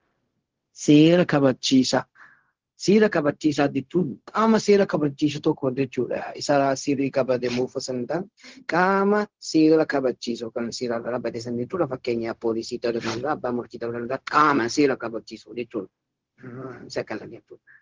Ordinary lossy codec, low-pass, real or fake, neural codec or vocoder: Opus, 16 kbps; 7.2 kHz; fake; codec, 16 kHz, 0.4 kbps, LongCat-Audio-Codec